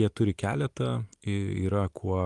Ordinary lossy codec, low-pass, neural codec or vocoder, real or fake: Opus, 24 kbps; 10.8 kHz; none; real